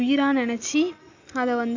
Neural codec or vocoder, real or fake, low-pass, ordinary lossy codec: none; real; 7.2 kHz; Opus, 64 kbps